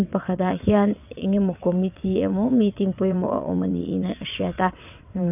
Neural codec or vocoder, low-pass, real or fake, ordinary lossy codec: vocoder, 22.05 kHz, 80 mel bands, WaveNeXt; 3.6 kHz; fake; none